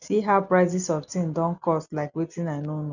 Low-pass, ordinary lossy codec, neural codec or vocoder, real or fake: 7.2 kHz; none; none; real